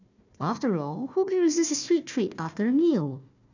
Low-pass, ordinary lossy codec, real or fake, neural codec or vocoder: 7.2 kHz; none; fake; codec, 16 kHz, 1 kbps, FunCodec, trained on Chinese and English, 50 frames a second